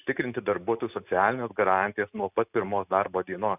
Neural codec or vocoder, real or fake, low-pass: none; real; 3.6 kHz